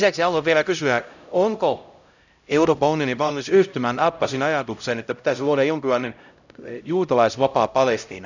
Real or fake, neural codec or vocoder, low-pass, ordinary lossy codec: fake; codec, 16 kHz, 0.5 kbps, X-Codec, HuBERT features, trained on LibriSpeech; 7.2 kHz; none